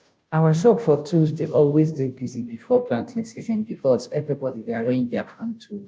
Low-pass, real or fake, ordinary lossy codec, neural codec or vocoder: none; fake; none; codec, 16 kHz, 0.5 kbps, FunCodec, trained on Chinese and English, 25 frames a second